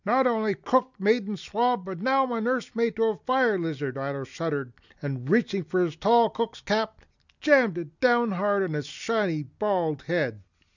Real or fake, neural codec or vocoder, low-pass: real; none; 7.2 kHz